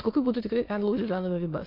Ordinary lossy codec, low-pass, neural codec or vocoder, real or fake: AAC, 24 kbps; 5.4 kHz; autoencoder, 22.05 kHz, a latent of 192 numbers a frame, VITS, trained on many speakers; fake